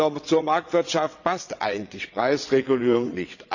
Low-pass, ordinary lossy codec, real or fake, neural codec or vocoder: 7.2 kHz; none; fake; vocoder, 22.05 kHz, 80 mel bands, WaveNeXt